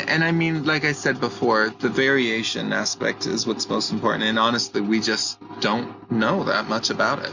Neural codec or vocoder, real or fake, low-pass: none; real; 7.2 kHz